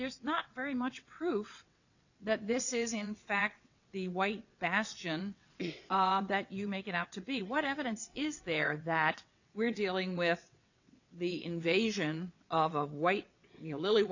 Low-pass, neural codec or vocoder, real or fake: 7.2 kHz; vocoder, 22.05 kHz, 80 mel bands, WaveNeXt; fake